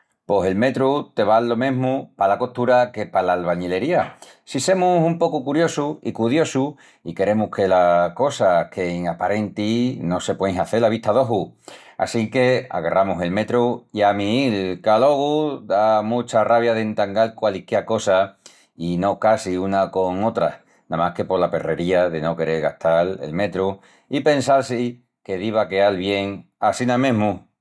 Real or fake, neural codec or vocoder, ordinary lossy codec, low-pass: real; none; none; none